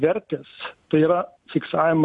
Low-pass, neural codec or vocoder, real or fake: 10.8 kHz; none; real